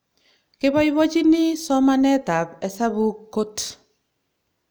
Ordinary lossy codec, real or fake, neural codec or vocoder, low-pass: none; real; none; none